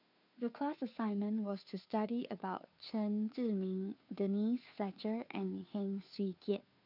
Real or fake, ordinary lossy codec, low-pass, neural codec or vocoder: fake; none; 5.4 kHz; codec, 16 kHz, 2 kbps, FunCodec, trained on Chinese and English, 25 frames a second